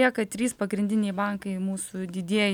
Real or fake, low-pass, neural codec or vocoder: real; 19.8 kHz; none